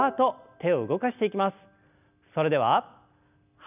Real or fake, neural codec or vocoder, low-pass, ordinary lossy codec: real; none; 3.6 kHz; none